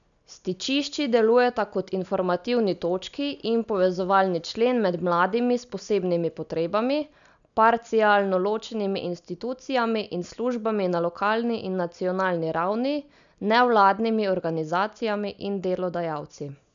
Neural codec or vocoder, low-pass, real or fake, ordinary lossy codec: none; 7.2 kHz; real; none